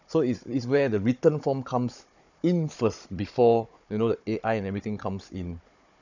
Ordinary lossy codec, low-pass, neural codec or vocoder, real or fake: none; 7.2 kHz; codec, 16 kHz, 16 kbps, FunCodec, trained on Chinese and English, 50 frames a second; fake